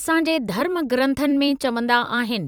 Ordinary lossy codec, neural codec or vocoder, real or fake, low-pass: none; vocoder, 44.1 kHz, 128 mel bands every 256 samples, BigVGAN v2; fake; 19.8 kHz